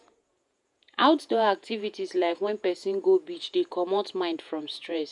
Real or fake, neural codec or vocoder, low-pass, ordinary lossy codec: fake; vocoder, 48 kHz, 128 mel bands, Vocos; 10.8 kHz; MP3, 96 kbps